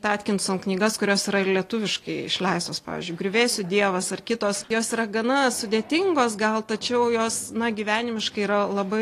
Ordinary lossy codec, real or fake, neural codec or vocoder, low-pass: AAC, 48 kbps; real; none; 14.4 kHz